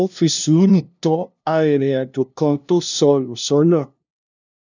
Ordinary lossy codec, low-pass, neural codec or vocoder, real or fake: none; 7.2 kHz; codec, 16 kHz, 0.5 kbps, FunCodec, trained on LibriTTS, 25 frames a second; fake